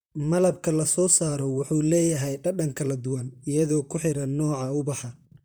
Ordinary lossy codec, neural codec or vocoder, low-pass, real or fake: none; vocoder, 44.1 kHz, 128 mel bands, Pupu-Vocoder; none; fake